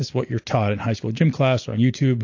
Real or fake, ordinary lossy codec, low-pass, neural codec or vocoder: real; AAC, 48 kbps; 7.2 kHz; none